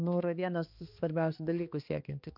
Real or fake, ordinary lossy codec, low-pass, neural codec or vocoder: fake; MP3, 48 kbps; 5.4 kHz; codec, 16 kHz, 2 kbps, X-Codec, HuBERT features, trained on balanced general audio